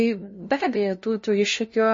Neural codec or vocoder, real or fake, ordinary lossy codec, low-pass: codec, 16 kHz, 0.5 kbps, FunCodec, trained on LibriTTS, 25 frames a second; fake; MP3, 32 kbps; 7.2 kHz